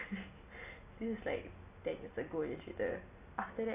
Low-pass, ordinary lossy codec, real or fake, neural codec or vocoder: 3.6 kHz; AAC, 24 kbps; real; none